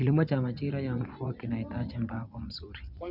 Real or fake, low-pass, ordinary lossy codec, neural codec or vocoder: fake; 5.4 kHz; none; vocoder, 24 kHz, 100 mel bands, Vocos